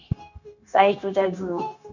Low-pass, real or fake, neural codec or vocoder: 7.2 kHz; fake; codec, 16 kHz, 0.9 kbps, LongCat-Audio-Codec